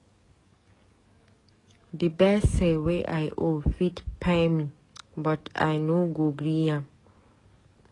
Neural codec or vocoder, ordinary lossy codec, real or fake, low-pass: codec, 44.1 kHz, 7.8 kbps, DAC; AAC, 32 kbps; fake; 10.8 kHz